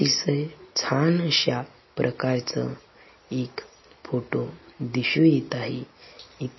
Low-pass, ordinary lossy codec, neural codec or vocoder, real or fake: 7.2 kHz; MP3, 24 kbps; none; real